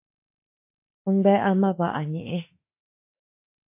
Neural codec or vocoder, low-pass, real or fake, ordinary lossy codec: autoencoder, 48 kHz, 32 numbers a frame, DAC-VAE, trained on Japanese speech; 3.6 kHz; fake; MP3, 24 kbps